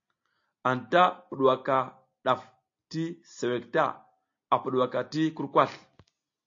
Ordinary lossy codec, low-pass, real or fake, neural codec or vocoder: AAC, 48 kbps; 7.2 kHz; real; none